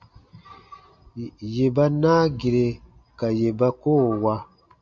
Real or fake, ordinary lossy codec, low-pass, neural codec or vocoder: real; AAC, 64 kbps; 7.2 kHz; none